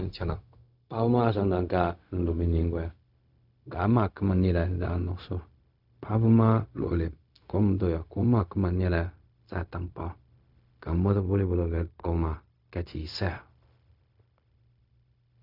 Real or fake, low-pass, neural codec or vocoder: fake; 5.4 kHz; codec, 16 kHz, 0.4 kbps, LongCat-Audio-Codec